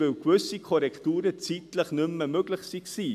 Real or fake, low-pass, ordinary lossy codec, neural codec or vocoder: real; 14.4 kHz; AAC, 96 kbps; none